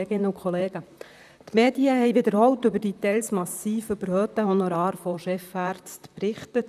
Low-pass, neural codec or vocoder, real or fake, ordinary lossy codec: 14.4 kHz; vocoder, 44.1 kHz, 128 mel bands, Pupu-Vocoder; fake; none